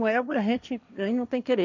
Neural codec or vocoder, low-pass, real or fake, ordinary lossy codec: codec, 16 kHz, 1.1 kbps, Voila-Tokenizer; 7.2 kHz; fake; none